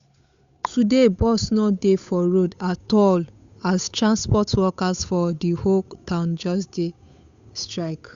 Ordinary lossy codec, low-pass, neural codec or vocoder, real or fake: Opus, 64 kbps; 7.2 kHz; codec, 16 kHz, 8 kbps, FunCodec, trained on Chinese and English, 25 frames a second; fake